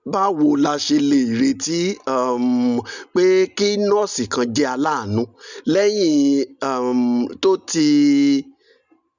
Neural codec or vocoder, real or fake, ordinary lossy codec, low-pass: none; real; none; 7.2 kHz